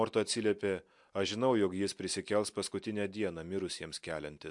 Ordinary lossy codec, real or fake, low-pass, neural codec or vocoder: MP3, 64 kbps; real; 10.8 kHz; none